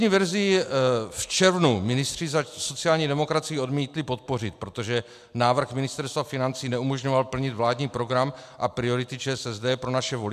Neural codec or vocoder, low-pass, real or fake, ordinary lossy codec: none; 14.4 kHz; real; AAC, 96 kbps